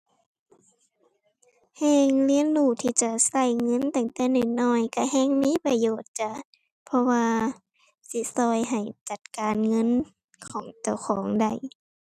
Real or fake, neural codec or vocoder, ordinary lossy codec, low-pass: real; none; none; 14.4 kHz